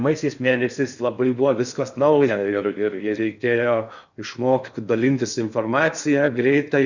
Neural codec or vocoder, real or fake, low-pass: codec, 16 kHz in and 24 kHz out, 0.6 kbps, FocalCodec, streaming, 4096 codes; fake; 7.2 kHz